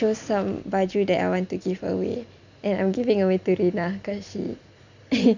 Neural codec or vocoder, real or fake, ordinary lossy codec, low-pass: none; real; none; 7.2 kHz